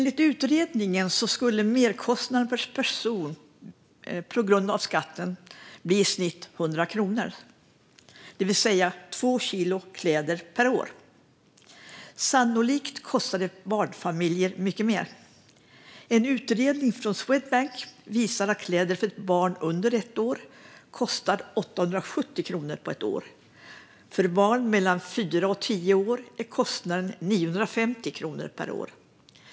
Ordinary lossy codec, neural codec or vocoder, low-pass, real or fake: none; none; none; real